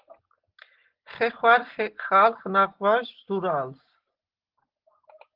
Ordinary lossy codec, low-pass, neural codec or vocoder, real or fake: Opus, 16 kbps; 5.4 kHz; none; real